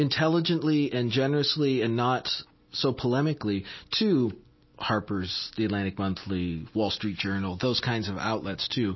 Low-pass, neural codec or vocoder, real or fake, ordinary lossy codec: 7.2 kHz; none; real; MP3, 24 kbps